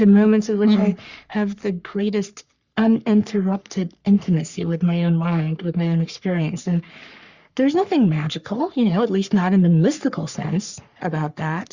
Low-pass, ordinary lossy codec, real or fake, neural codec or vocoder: 7.2 kHz; Opus, 64 kbps; fake; codec, 44.1 kHz, 3.4 kbps, Pupu-Codec